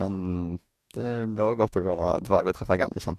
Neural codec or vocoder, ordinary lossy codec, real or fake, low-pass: codec, 44.1 kHz, 2.6 kbps, DAC; none; fake; 14.4 kHz